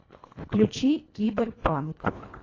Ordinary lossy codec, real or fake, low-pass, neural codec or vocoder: AAC, 32 kbps; fake; 7.2 kHz; codec, 24 kHz, 1.5 kbps, HILCodec